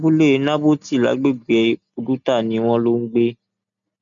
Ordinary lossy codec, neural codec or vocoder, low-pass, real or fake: none; none; 7.2 kHz; real